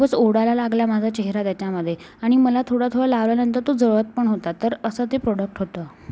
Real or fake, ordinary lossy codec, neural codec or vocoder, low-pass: real; none; none; none